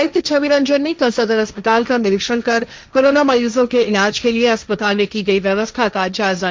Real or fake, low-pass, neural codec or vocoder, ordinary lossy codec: fake; none; codec, 16 kHz, 1.1 kbps, Voila-Tokenizer; none